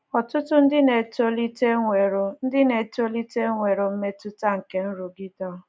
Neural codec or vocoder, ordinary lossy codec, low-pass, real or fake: none; none; none; real